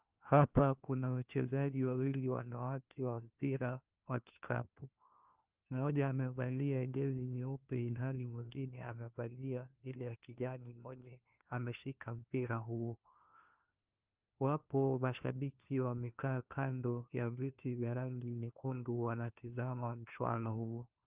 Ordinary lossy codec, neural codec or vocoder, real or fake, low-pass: Opus, 32 kbps; codec, 16 kHz, 1 kbps, FunCodec, trained on LibriTTS, 50 frames a second; fake; 3.6 kHz